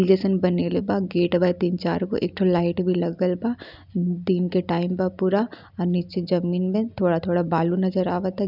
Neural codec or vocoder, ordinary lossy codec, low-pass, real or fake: none; none; 5.4 kHz; real